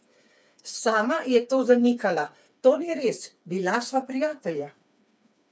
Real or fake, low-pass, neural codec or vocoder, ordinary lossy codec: fake; none; codec, 16 kHz, 4 kbps, FreqCodec, smaller model; none